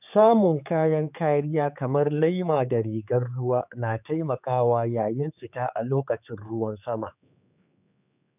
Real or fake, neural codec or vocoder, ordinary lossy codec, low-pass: fake; codec, 16 kHz, 4 kbps, X-Codec, HuBERT features, trained on general audio; none; 3.6 kHz